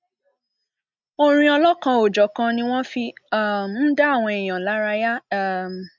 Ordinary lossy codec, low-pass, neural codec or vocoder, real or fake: MP3, 64 kbps; 7.2 kHz; none; real